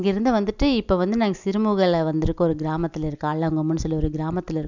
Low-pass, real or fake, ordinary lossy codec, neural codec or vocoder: 7.2 kHz; real; none; none